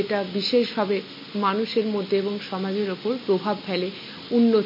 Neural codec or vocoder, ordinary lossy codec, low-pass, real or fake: none; MP3, 24 kbps; 5.4 kHz; real